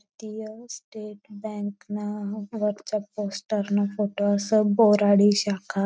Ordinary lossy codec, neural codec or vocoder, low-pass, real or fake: none; none; none; real